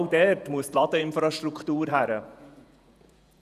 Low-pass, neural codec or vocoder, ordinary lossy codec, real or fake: 14.4 kHz; vocoder, 48 kHz, 128 mel bands, Vocos; none; fake